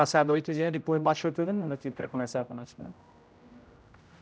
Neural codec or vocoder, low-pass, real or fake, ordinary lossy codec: codec, 16 kHz, 0.5 kbps, X-Codec, HuBERT features, trained on general audio; none; fake; none